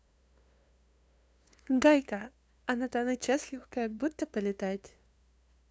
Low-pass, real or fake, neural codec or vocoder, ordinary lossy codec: none; fake; codec, 16 kHz, 2 kbps, FunCodec, trained on LibriTTS, 25 frames a second; none